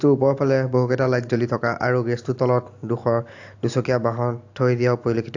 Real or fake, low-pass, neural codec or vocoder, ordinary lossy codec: real; 7.2 kHz; none; AAC, 48 kbps